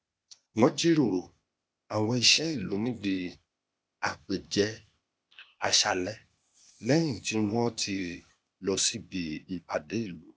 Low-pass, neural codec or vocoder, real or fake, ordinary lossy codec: none; codec, 16 kHz, 0.8 kbps, ZipCodec; fake; none